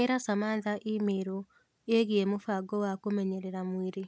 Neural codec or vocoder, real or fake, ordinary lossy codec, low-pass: none; real; none; none